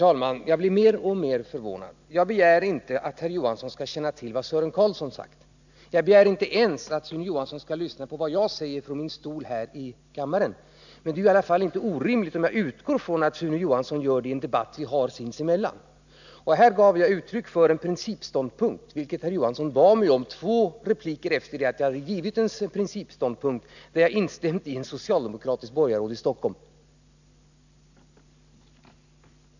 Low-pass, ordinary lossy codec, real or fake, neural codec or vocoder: 7.2 kHz; none; real; none